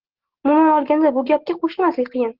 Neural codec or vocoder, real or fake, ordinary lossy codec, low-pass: none; real; Opus, 16 kbps; 5.4 kHz